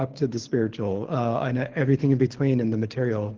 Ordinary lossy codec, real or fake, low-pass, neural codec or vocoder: Opus, 16 kbps; fake; 7.2 kHz; codec, 24 kHz, 6 kbps, HILCodec